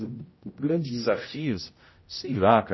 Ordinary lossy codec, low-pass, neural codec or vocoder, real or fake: MP3, 24 kbps; 7.2 kHz; codec, 16 kHz, 0.5 kbps, X-Codec, HuBERT features, trained on general audio; fake